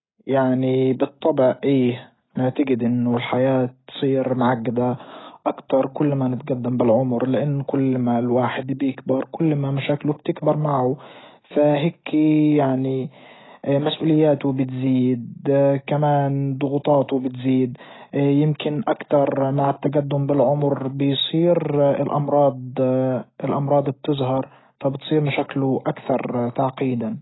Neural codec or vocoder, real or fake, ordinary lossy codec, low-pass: none; real; AAC, 16 kbps; 7.2 kHz